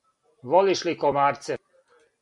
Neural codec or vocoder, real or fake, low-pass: none; real; 10.8 kHz